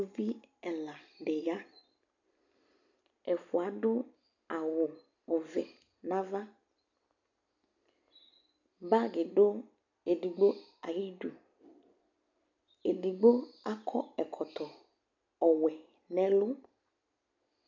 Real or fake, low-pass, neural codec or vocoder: real; 7.2 kHz; none